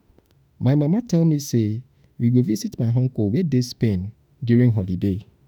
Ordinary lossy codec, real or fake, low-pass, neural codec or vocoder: none; fake; none; autoencoder, 48 kHz, 32 numbers a frame, DAC-VAE, trained on Japanese speech